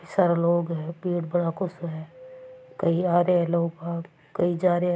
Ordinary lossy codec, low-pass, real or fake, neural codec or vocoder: none; none; real; none